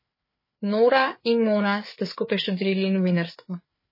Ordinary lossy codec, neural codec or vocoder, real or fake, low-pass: MP3, 24 kbps; codec, 16 kHz, 4 kbps, FreqCodec, larger model; fake; 5.4 kHz